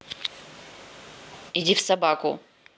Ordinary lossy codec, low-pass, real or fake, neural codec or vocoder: none; none; real; none